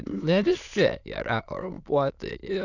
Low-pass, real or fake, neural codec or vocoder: 7.2 kHz; fake; autoencoder, 22.05 kHz, a latent of 192 numbers a frame, VITS, trained on many speakers